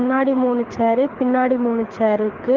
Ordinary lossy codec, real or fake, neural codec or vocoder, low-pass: Opus, 16 kbps; fake; codec, 24 kHz, 6 kbps, HILCodec; 7.2 kHz